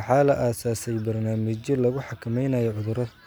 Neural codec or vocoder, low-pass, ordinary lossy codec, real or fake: none; none; none; real